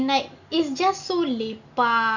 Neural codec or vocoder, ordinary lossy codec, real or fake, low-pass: none; none; real; 7.2 kHz